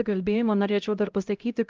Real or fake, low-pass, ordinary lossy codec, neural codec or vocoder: fake; 7.2 kHz; Opus, 24 kbps; codec, 16 kHz, 0.5 kbps, X-Codec, HuBERT features, trained on LibriSpeech